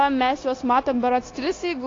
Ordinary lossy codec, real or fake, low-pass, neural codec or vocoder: AAC, 32 kbps; fake; 7.2 kHz; codec, 16 kHz, 0.9 kbps, LongCat-Audio-Codec